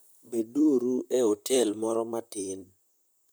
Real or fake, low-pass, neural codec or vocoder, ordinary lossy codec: fake; none; vocoder, 44.1 kHz, 128 mel bands, Pupu-Vocoder; none